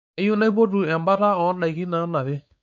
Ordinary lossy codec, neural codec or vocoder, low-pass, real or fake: none; codec, 16 kHz, 4 kbps, X-Codec, WavLM features, trained on Multilingual LibriSpeech; 7.2 kHz; fake